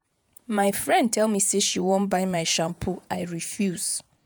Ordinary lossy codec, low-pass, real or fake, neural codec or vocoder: none; none; fake; vocoder, 48 kHz, 128 mel bands, Vocos